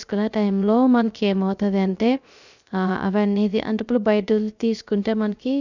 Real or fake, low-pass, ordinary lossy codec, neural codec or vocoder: fake; 7.2 kHz; none; codec, 16 kHz, 0.3 kbps, FocalCodec